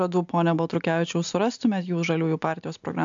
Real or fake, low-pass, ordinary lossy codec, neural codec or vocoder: real; 7.2 kHz; AAC, 64 kbps; none